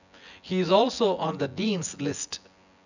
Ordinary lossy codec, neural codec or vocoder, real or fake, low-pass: none; vocoder, 24 kHz, 100 mel bands, Vocos; fake; 7.2 kHz